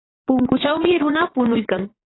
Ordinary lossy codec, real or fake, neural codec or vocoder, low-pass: AAC, 16 kbps; fake; vocoder, 22.05 kHz, 80 mel bands, Vocos; 7.2 kHz